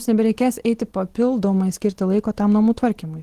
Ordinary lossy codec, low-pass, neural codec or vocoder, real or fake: Opus, 16 kbps; 14.4 kHz; none; real